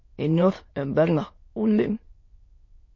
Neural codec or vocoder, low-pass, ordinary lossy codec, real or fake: autoencoder, 22.05 kHz, a latent of 192 numbers a frame, VITS, trained on many speakers; 7.2 kHz; MP3, 32 kbps; fake